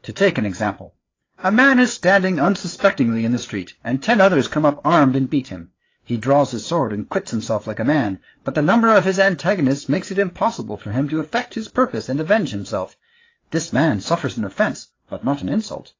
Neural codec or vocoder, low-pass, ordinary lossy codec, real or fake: codec, 16 kHz, 4 kbps, FreqCodec, larger model; 7.2 kHz; AAC, 32 kbps; fake